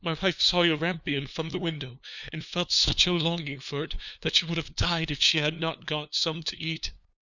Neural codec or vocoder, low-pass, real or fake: codec, 16 kHz, 2 kbps, FunCodec, trained on LibriTTS, 25 frames a second; 7.2 kHz; fake